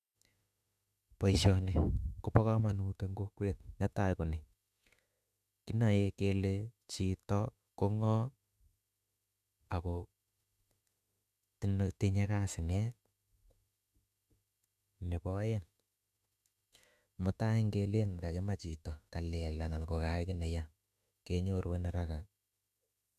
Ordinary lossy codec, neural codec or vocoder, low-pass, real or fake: none; autoencoder, 48 kHz, 32 numbers a frame, DAC-VAE, trained on Japanese speech; 14.4 kHz; fake